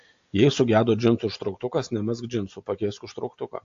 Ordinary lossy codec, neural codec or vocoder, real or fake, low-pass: AAC, 48 kbps; none; real; 7.2 kHz